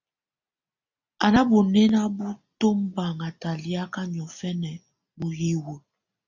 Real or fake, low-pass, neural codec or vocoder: real; 7.2 kHz; none